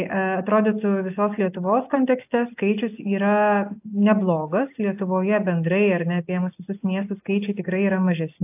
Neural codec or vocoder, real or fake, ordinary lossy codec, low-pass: none; real; AAC, 32 kbps; 3.6 kHz